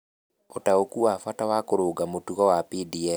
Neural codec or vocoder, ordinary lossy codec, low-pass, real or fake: none; none; none; real